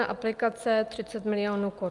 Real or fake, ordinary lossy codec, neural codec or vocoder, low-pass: real; Opus, 32 kbps; none; 10.8 kHz